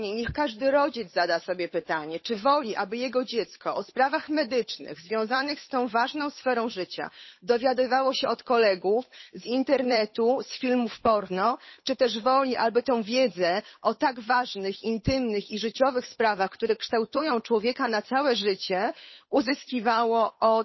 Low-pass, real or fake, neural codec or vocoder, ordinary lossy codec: 7.2 kHz; fake; codec, 16 kHz, 8 kbps, FunCodec, trained on Chinese and English, 25 frames a second; MP3, 24 kbps